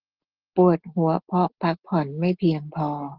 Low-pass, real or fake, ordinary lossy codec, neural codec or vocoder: 5.4 kHz; real; Opus, 16 kbps; none